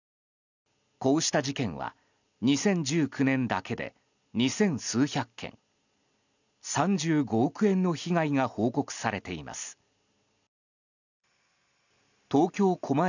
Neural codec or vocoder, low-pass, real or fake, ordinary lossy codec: none; 7.2 kHz; real; none